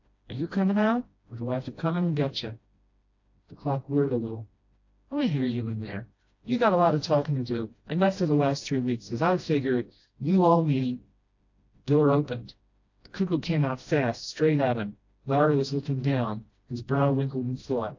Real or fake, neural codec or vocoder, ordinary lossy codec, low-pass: fake; codec, 16 kHz, 1 kbps, FreqCodec, smaller model; AAC, 48 kbps; 7.2 kHz